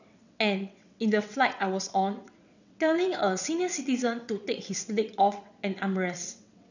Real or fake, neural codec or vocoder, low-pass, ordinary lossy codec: fake; vocoder, 22.05 kHz, 80 mel bands, WaveNeXt; 7.2 kHz; none